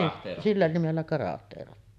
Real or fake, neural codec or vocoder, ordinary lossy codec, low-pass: real; none; none; 14.4 kHz